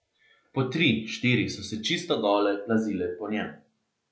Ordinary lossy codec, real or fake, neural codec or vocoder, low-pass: none; real; none; none